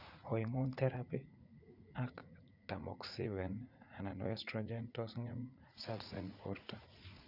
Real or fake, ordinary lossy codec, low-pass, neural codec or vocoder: fake; none; 5.4 kHz; vocoder, 44.1 kHz, 80 mel bands, Vocos